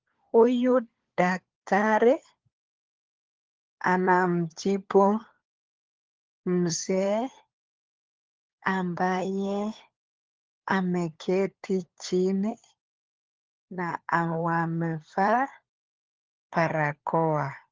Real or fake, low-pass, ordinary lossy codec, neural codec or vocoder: fake; 7.2 kHz; Opus, 16 kbps; codec, 16 kHz, 4 kbps, FunCodec, trained on LibriTTS, 50 frames a second